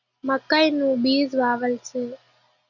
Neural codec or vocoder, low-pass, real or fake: none; 7.2 kHz; real